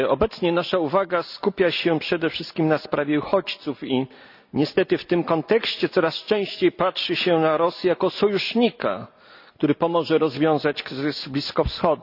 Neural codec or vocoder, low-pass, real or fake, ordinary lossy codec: none; 5.4 kHz; real; none